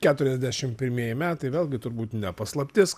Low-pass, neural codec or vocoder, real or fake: 14.4 kHz; none; real